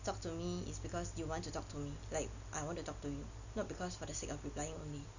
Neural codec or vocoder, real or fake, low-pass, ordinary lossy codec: none; real; 7.2 kHz; none